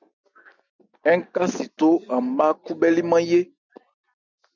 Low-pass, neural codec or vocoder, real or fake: 7.2 kHz; none; real